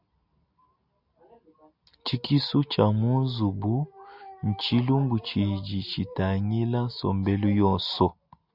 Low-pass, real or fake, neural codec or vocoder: 5.4 kHz; real; none